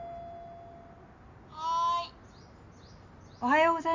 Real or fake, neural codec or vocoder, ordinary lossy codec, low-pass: real; none; MP3, 64 kbps; 7.2 kHz